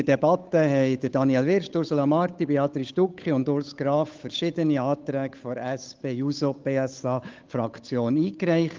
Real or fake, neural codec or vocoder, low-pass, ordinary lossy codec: fake; codec, 16 kHz, 8 kbps, FunCodec, trained on Chinese and English, 25 frames a second; 7.2 kHz; Opus, 32 kbps